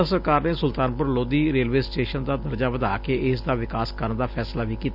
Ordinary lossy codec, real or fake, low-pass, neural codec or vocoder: none; real; 5.4 kHz; none